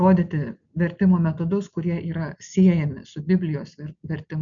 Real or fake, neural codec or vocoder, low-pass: real; none; 7.2 kHz